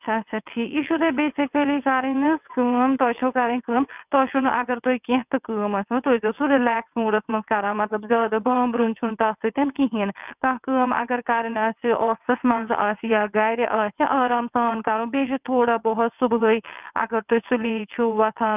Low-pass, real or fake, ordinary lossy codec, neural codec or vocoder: 3.6 kHz; fake; none; vocoder, 22.05 kHz, 80 mel bands, WaveNeXt